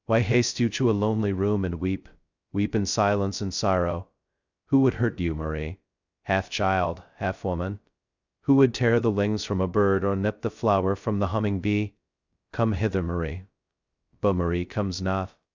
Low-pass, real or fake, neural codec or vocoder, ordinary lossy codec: 7.2 kHz; fake; codec, 16 kHz, 0.2 kbps, FocalCodec; Opus, 64 kbps